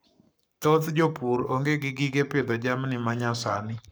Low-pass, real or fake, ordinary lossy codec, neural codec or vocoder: none; fake; none; codec, 44.1 kHz, 7.8 kbps, Pupu-Codec